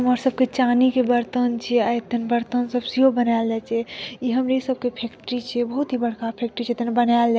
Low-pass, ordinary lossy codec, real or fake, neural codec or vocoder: none; none; real; none